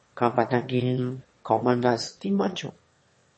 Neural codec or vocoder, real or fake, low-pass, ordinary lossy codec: autoencoder, 22.05 kHz, a latent of 192 numbers a frame, VITS, trained on one speaker; fake; 9.9 kHz; MP3, 32 kbps